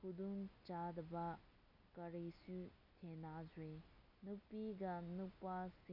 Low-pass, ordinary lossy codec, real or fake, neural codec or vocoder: 5.4 kHz; none; real; none